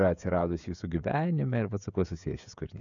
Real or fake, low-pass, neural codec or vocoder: fake; 7.2 kHz; codec, 16 kHz, 16 kbps, FreqCodec, smaller model